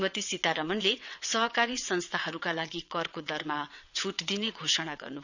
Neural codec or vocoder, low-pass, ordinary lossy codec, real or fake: vocoder, 22.05 kHz, 80 mel bands, WaveNeXt; 7.2 kHz; none; fake